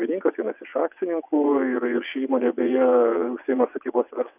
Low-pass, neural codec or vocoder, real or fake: 3.6 kHz; vocoder, 22.05 kHz, 80 mel bands, WaveNeXt; fake